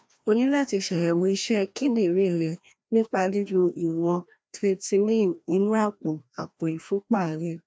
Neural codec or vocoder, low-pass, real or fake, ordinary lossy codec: codec, 16 kHz, 1 kbps, FreqCodec, larger model; none; fake; none